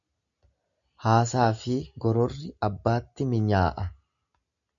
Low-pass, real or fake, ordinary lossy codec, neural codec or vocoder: 7.2 kHz; real; MP3, 64 kbps; none